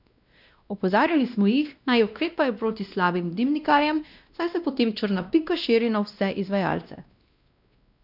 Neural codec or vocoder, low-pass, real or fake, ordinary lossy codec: codec, 16 kHz, 1 kbps, X-Codec, WavLM features, trained on Multilingual LibriSpeech; 5.4 kHz; fake; none